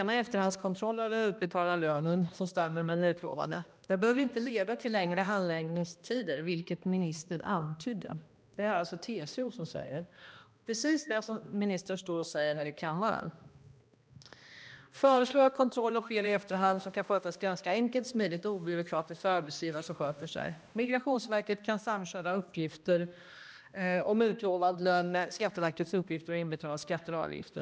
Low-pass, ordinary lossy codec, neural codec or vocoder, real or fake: none; none; codec, 16 kHz, 1 kbps, X-Codec, HuBERT features, trained on balanced general audio; fake